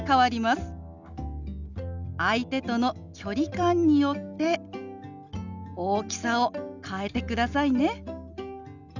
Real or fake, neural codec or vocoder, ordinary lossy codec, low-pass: real; none; none; 7.2 kHz